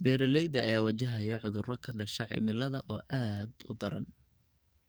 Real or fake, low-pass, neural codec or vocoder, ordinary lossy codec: fake; none; codec, 44.1 kHz, 2.6 kbps, SNAC; none